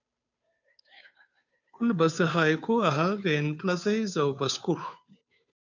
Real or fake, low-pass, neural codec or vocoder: fake; 7.2 kHz; codec, 16 kHz, 2 kbps, FunCodec, trained on Chinese and English, 25 frames a second